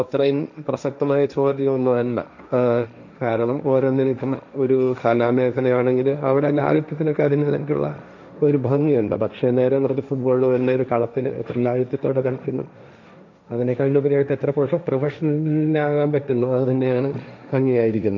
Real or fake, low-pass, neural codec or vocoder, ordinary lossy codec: fake; 7.2 kHz; codec, 16 kHz, 1.1 kbps, Voila-Tokenizer; none